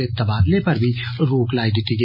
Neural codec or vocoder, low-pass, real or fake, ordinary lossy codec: none; 5.4 kHz; real; MP3, 24 kbps